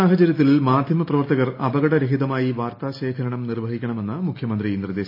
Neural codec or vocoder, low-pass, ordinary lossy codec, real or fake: none; 5.4 kHz; AAC, 32 kbps; real